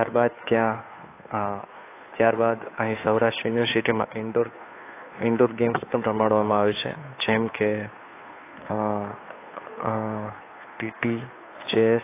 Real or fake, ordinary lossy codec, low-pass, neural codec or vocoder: fake; AAC, 24 kbps; 3.6 kHz; codec, 16 kHz, 2 kbps, FunCodec, trained on Chinese and English, 25 frames a second